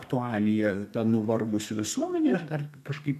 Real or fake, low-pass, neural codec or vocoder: fake; 14.4 kHz; codec, 32 kHz, 1.9 kbps, SNAC